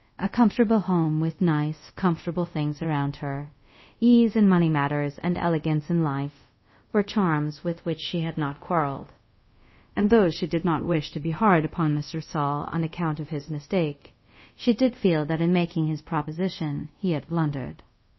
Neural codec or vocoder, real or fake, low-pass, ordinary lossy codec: codec, 24 kHz, 0.5 kbps, DualCodec; fake; 7.2 kHz; MP3, 24 kbps